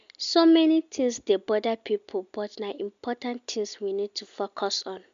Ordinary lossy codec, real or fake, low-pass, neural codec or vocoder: MP3, 64 kbps; real; 7.2 kHz; none